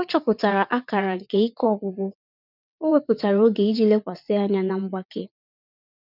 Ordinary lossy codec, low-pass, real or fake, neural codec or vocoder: none; 5.4 kHz; fake; vocoder, 22.05 kHz, 80 mel bands, WaveNeXt